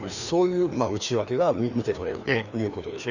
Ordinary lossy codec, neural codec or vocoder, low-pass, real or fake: none; codec, 16 kHz, 2 kbps, FreqCodec, larger model; 7.2 kHz; fake